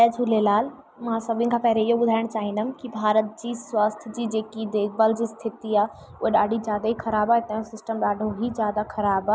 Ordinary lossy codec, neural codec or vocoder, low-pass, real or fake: none; none; none; real